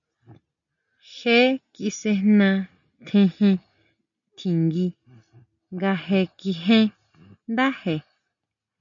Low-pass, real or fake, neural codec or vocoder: 7.2 kHz; real; none